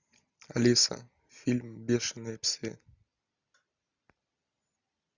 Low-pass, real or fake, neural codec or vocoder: 7.2 kHz; real; none